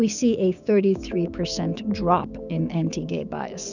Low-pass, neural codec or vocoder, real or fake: 7.2 kHz; codec, 16 kHz, 6 kbps, DAC; fake